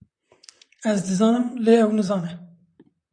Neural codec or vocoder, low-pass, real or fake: vocoder, 44.1 kHz, 128 mel bands, Pupu-Vocoder; 9.9 kHz; fake